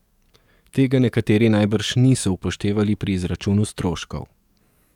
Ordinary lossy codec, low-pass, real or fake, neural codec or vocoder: none; 19.8 kHz; fake; codec, 44.1 kHz, 7.8 kbps, DAC